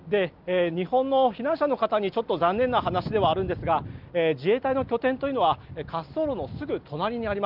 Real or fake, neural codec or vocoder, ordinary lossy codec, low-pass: real; none; Opus, 32 kbps; 5.4 kHz